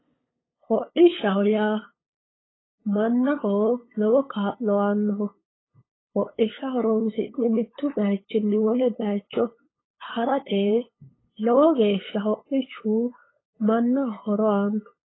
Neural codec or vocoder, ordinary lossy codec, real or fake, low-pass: codec, 16 kHz, 8 kbps, FunCodec, trained on LibriTTS, 25 frames a second; AAC, 16 kbps; fake; 7.2 kHz